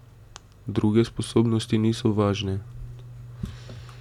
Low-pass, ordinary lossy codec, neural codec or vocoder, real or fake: 19.8 kHz; MP3, 96 kbps; none; real